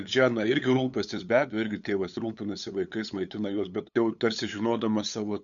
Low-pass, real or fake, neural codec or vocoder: 7.2 kHz; fake; codec, 16 kHz, 8 kbps, FunCodec, trained on LibriTTS, 25 frames a second